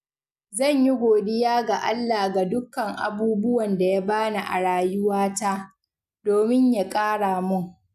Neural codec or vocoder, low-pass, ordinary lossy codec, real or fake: none; 14.4 kHz; none; real